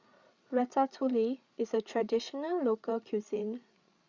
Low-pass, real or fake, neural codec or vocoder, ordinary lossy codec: 7.2 kHz; fake; codec, 16 kHz, 8 kbps, FreqCodec, larger model; Opus, 64 kbps